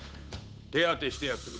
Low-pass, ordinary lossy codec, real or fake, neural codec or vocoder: none; none; fake; codec, 16 kHz, 8 kbps, FunCodec, trained on Chinese and English, 25 frames a second